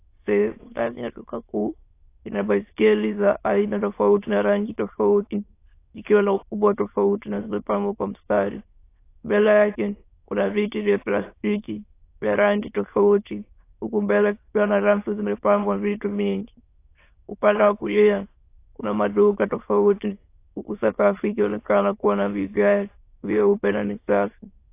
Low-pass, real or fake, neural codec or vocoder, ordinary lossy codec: 3.6 kHz; fake; autoencoder, 22.05 kHz, a latent of 192 numbers a frame, VITS, trained on many speakers; AAC, 24 kbps